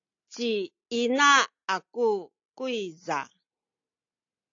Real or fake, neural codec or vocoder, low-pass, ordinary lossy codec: real; none; 7.2 kHz; AAC, 48 kbps